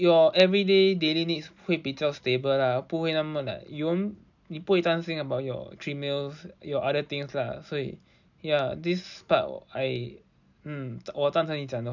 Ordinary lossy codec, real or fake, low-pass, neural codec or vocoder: none; real; 7.2 kHz; none